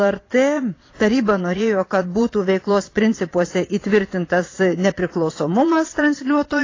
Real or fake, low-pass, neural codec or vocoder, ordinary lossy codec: fake; 7.2 kHz; vocoder, 22.05 kHz, 80 mel bands, Vocos; AAC, 32 kbps